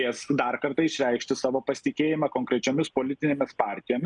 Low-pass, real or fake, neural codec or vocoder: 10.8 kHz; real; none